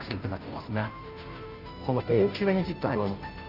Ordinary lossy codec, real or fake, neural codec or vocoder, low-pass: Opus, 24 kbps; fake; codec, 16 kHz, 0.5 kbps, FunCodec, trained on Chinese and English, 25 frames a second; 5.4 kHz